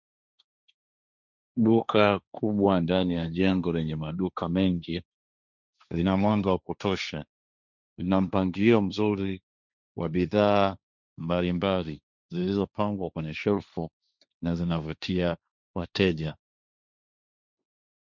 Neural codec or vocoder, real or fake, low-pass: codec, 16 kHz, 1.1 kbps, Voila-Tokenizer; fake; 7.2 kHz